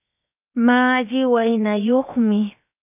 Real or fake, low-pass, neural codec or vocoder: fake; 3.6 kHz; codec, 16 kHz, 0.7 kbps, FocalCodec